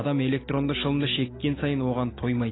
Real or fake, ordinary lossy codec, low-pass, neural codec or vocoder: real; AAC, 16 kbps; 7.2 kHz; none